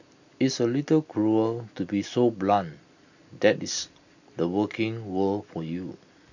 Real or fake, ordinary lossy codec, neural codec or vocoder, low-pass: real; none; none; 7.2 kHz